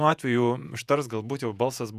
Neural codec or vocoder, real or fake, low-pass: vocoder, 48 kHz, 128 mel bands, Vocos; fake; 14.4 kHz